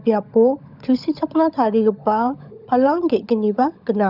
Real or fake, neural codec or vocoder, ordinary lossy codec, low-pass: fake; codec, 16 kHz, 16 kbps, FunCodec, trained on LibriTTS, 50 frames a second; none; 5.4 kHz